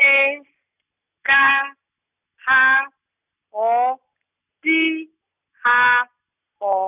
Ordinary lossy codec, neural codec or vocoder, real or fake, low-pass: none; none; real; 3.6 kHz